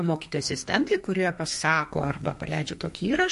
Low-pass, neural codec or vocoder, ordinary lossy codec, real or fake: 14.4 kHz; codec, 32 kHz, 1.9 kbps, SNAC; MP3, 48 kbps; fake